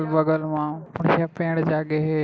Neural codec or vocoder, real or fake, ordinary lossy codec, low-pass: none; real; none; none